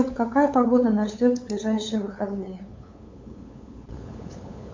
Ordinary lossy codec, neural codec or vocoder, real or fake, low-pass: MP3, 64 kbps; codec, 16 kHz, 8 kbps, FunCodec, trained on LibriTTS, 25 frames a second; fake; 7.2 kHz